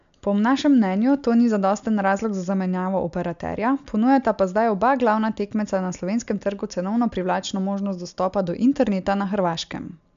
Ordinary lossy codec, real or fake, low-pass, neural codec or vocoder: MP3, 64 kbps; real; 7.2 kHz; none